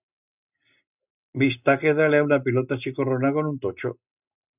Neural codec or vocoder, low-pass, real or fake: none; 3.6 kHz; real